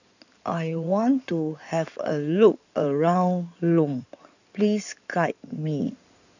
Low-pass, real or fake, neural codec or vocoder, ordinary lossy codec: 7.2 kHz; fake; codec, 16 kHz in and 24 kHz out, 2.2 kbps, FireRedTTS-2 codec; none